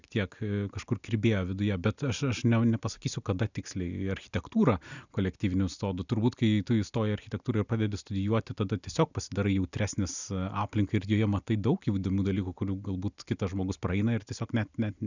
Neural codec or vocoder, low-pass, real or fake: none; 7.2 kHz; real